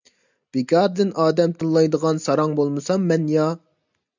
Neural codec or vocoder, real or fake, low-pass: none; real; 7.2 kHz